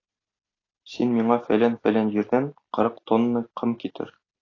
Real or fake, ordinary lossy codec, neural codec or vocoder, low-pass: real; AAC, 32 kbps; none; 7.2 kHz